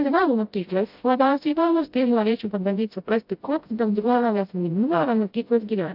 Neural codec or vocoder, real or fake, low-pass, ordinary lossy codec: codec, 16 kHz, 0.5 kbps, FreqCodec, smaller model; fake; 5.4 kHz; AAC, 48 kbps